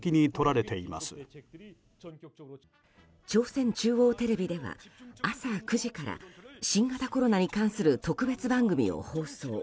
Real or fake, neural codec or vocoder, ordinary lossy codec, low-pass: real; none; none; none